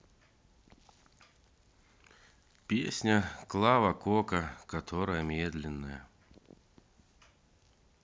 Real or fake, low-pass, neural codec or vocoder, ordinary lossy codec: real; none; none; none